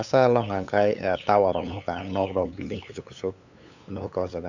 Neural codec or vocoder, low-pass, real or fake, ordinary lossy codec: codec, 16 kHz, 8 kbps, FunCodec, trained on LibriTTS, 25 frames a second; 7.2 kHz; fake; none